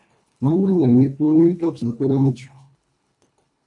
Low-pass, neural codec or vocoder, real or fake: 10.8 kHz; codec, 24 kHz, 1.5 kbps, HILCodec; fake